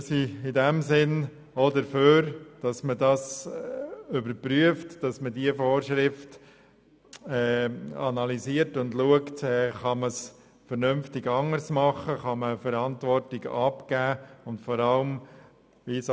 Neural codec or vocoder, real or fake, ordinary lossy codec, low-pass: none; real; none; none